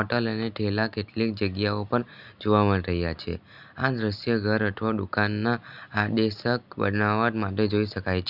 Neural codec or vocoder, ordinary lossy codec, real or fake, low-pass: none; none; real; 5.4 kHz